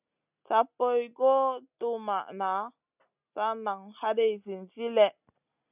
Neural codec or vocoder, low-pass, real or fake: none; 3.6 kHz; real